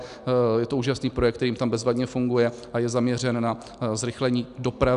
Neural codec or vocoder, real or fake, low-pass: none; real; 10.8 kHz